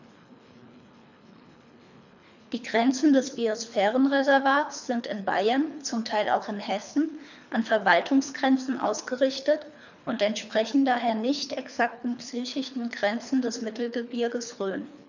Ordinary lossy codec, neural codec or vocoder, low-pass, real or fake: none; codec, 24 kHz, 3 kbps, HILCodec; 7.2 kHz; fake